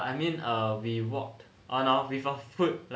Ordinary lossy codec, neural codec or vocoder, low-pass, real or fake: none; none; none; real